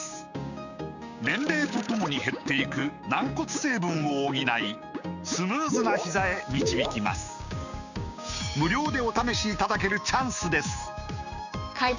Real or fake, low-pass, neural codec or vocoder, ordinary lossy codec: fake; 7.2 kHz; codec, 16 kHz, 6 kbps, DAC; none